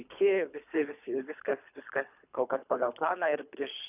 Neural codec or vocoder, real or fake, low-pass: codec, 24 kHz, 3 kbps, HILCodec; fake; 3.6 kHz